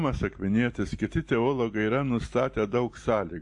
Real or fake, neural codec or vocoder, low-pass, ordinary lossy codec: real; none; 10.8 kHz; MP3, 48 kbps